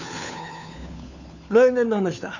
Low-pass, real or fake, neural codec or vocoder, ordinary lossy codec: 7.2 kHz; fake; codec, 16 kHz, 4 kbps, FunCodec, trained on LibriTTS, 50 frames a second; none